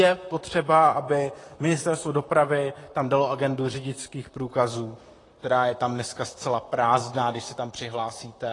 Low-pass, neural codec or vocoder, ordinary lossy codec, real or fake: 10.8 kHz; vocoder, 44.1 kHz, 128 mel bands, Pupu-Vocoder; AAC, 32 kbps; fake